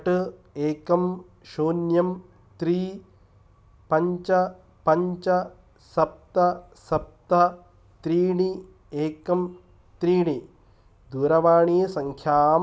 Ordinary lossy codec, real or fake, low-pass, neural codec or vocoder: none; real; none; none